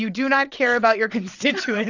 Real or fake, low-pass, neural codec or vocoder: fake; 7.2 kHz; vocoder, 22.05 kHz, 80 mel bands, WaveNeXt